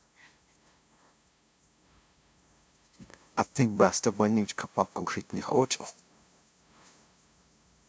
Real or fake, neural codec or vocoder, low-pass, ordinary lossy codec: fake; codec, 16 kHz, 0.5 kbps, FunCodec, trained on LibriTTS, 25 frames a second; none; none